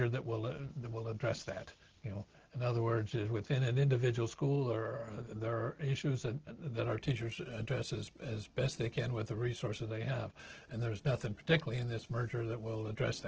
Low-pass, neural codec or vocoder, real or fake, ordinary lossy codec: 7.2 kHz; none; real; Opus, 16 kbps